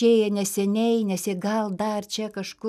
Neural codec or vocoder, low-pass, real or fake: none; 14.4 kHz; real